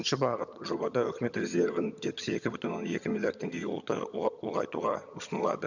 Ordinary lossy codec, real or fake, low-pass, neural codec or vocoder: none; fake; 7.2 kHz; vocoder, 22.05 kHz, 80 mel bands, HiFi-GAN